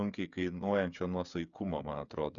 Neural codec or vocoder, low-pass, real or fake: codec, 16 kHz, 8 kbps, FreqCodec, smaller model; 7.2 kHz; fake